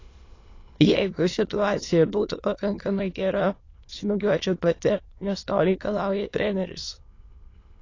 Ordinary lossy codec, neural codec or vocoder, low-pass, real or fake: AAC, 32 kbps; autoencoder, 22.05 kHz, a latent of 192 numbers a frame, VITS, trained on many speakers; 7.2 kHz; fake